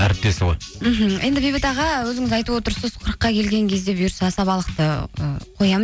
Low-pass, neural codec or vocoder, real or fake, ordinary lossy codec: none; none; real; none